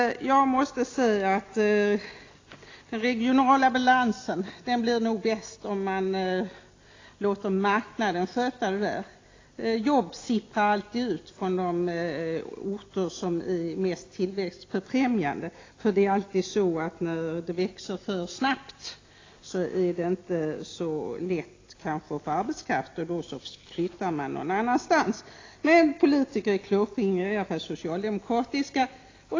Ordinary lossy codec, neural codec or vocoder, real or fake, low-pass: AAC, 32 kbps; none; real; 7.2 kHz